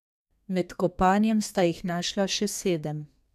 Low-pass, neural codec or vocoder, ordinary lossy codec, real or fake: 14.4 kHz; codec, 32 kHz, 1.9 kbps, SNAC; none; fake